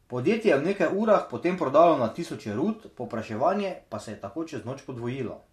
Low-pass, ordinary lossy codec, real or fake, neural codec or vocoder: 14.4 kHz; MP3, 64 kbps; real; none